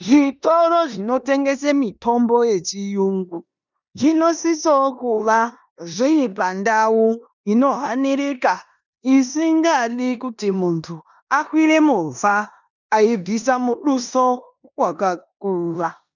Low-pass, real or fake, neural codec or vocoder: 7.2 kHz; fake; codec, 16 kHz in and 24 kHz out, 0.9 kbps, LongCat-Audio-Codec, four codebook decoder